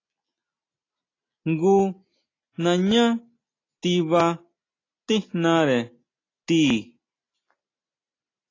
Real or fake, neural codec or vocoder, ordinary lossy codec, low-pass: real; none; AAC, 32 kbps; 7.2 kHz